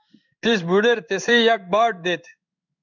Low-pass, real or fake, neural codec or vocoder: 7.2 kHz; fake; codec, 16 kHz in and 24 kHz out, 1 kbps, XY-Tokenizer